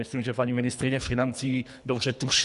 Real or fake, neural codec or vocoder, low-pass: fake; codec, 24 kHz, 3 kbps, HILCodec; 10.8 kHz